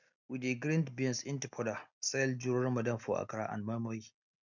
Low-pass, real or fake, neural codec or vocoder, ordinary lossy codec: 7.2 kHz; real; none; none